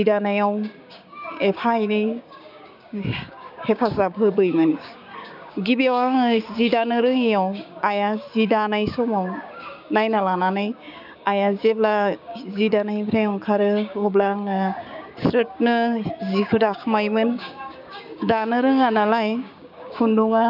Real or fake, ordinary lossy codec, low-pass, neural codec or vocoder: fake; none; 5.4 kHz; codec, 16 kHz, 6 kbps, DAC